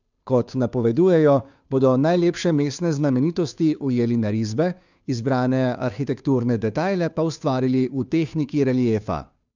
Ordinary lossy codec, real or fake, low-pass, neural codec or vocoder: none; fake; 7.2 kHz; codec, 16 kHz, 2 kbps, FunCodec, trained on Chinese and English, 25 frames a second